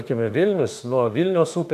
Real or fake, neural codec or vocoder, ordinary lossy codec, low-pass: fake; autoencoder, 48 kHz, 32 numbers a frame, DAC-VAE, trained on Japanese speech; MP3, 96 kbps; 14.4 kHz